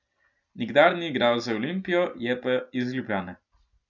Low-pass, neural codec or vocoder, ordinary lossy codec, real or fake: 7.2 kHz; none; none; real